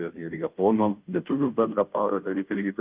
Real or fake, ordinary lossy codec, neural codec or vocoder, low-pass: fake; Opus, 32 kbps; codec, 16 kHz, 0.5 kbps, FunCodec, trained on Chinese and English, 25 frames a second; 3.6 kHz